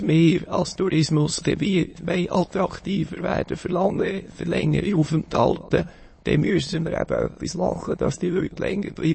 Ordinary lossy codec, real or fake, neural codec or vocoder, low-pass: MP3, 32 kbps; fake; autoencoder, 22.05 kHz, a latent of 192 numbers a frame, VITS, trained on many speakers; 9.9 kHz